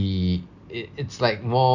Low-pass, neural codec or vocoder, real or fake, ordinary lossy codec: 7.2 kHz; none; real; none